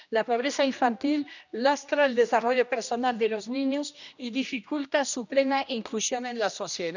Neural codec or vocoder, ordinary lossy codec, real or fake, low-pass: codec, 16 kHz, 1 kbps, X-Codec, HuBERT features, trained on general audio; none; fake; 7.2 kHz